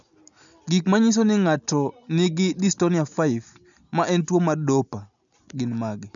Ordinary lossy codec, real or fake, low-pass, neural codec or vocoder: none; real; 7.2 kHz; none